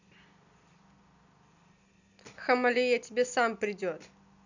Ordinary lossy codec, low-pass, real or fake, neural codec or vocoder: none; 7.2 kHz; real; none